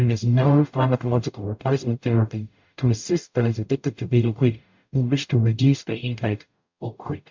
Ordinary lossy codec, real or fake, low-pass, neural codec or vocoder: MP3, 64 kbps; fake; 7.2 kHz; codec, 44.1 kHz, 0.9 kbps, DAC